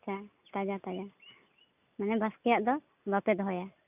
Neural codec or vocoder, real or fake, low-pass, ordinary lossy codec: none; real; 3.6 kHz; none